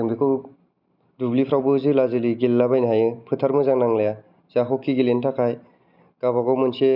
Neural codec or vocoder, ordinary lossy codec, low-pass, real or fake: none; none; 5.4 kHz; real